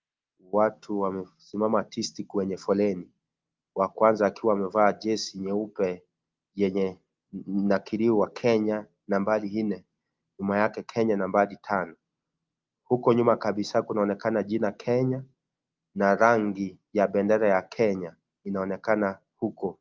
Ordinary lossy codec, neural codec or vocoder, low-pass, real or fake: Opus, 32 kbps; none; 7.2 kHz; real